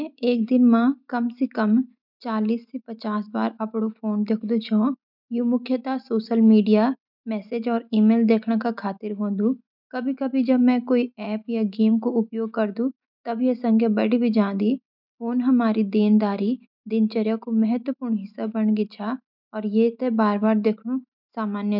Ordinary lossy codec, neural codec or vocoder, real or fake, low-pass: none; none; real; 5.4 kHz